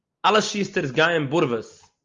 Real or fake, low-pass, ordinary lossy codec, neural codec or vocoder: real; 7.2 kHz; Opus, 32 kbps; none